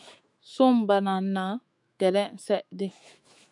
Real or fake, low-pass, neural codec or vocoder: fake; 10.8 kHz; autoencoder, 48 kHz, 128 numbers a frame, DAC-VAE, trained on Japanese speech